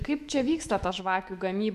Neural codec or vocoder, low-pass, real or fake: autoencoder, 48 kHz, 128 numbers a frame, DAC-VAE, trained on Japanese speech; 14.4 kHz; fake